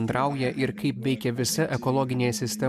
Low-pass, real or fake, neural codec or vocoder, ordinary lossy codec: 14.4 kHz; real; none; AAC, 96 kbps